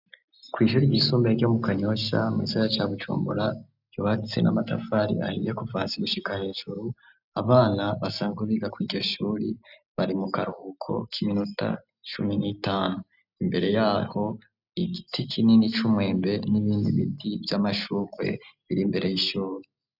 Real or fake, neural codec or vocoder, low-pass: real; none; 5.4 kHz